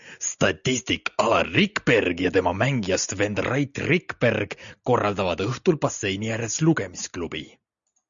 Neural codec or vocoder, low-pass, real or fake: none; 7.2 kHz; real